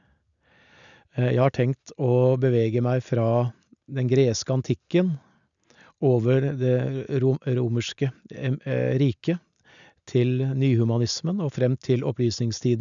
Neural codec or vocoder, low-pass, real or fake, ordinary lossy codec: none; 7.2 kHz; real; none